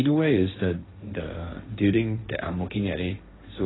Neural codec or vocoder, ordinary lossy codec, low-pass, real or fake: codec, 16 kHz, 1.1 kbps, Voila-Tokenizer; AAC, 16 kbps; 7.2 kHz; fake